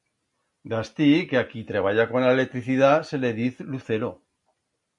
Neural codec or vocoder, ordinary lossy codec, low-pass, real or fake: none; MP3, 48 kbps; 10.8 kHz; real